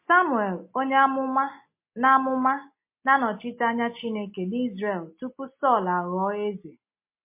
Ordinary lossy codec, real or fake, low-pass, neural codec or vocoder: MP3, 24 kbps; real; 3.6 kHz; none